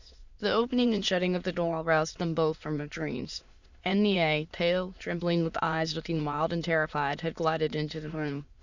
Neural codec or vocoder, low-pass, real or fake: autoencoder, 22.05 kHz, a latent of 192 numbers a frame, VITS, trained on many speakers; 7.2 kHz; fake